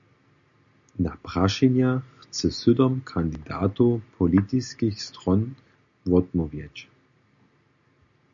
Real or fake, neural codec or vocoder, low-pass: real; none; 7.2 kHz